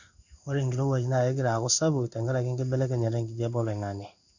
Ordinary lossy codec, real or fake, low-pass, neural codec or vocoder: none; fake; 7.2 kHz; codec, 16 kHz in and 24 kHz out, 1 kbps, XY-Tokenizer